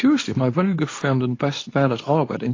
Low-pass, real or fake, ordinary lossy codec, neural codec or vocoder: 7.2 kHz; fake; AAC, 32 kbps; codec, 24 kHz, 0.9 kbps, WavTokenizer, medium speech release version 2